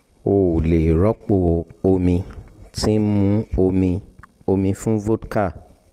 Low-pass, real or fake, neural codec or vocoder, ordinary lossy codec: 14.4 kHz; real; none; Opus, 24 kbps